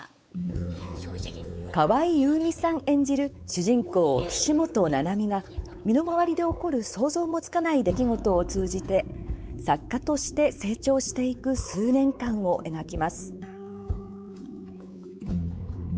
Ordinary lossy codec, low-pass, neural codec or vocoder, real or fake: none; none; codec, 16 kHz, 4 kbps, X-Codec, WavLM features, trained on Multilingual LibriSpeech; fake